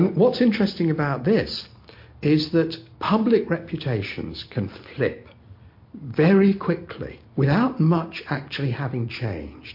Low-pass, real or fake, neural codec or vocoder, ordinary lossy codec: 5.4 kHz; real; none; MP3, 32 kbps